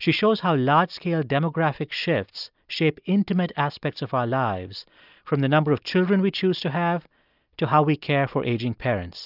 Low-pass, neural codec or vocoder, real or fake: 5.4 kHz; none; real